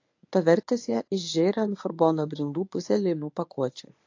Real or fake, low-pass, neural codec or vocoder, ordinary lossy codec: fake; 7.2 kHz; codec, 24 kHz, 0.9 kbps, WavTokenizer, medium speech release version 1; AAC, 48 kbps